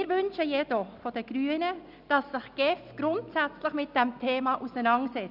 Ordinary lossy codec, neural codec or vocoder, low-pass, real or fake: none; none; 5.4 kHz; real